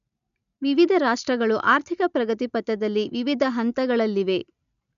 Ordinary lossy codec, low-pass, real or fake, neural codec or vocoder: none; 7.2 kHz; real; none